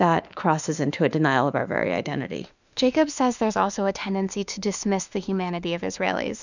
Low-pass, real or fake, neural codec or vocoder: 7.2 kHz; fake; autoencoder, 48 kHz, 32 numbers a frame, DAC-VAE, trained on Japanese speech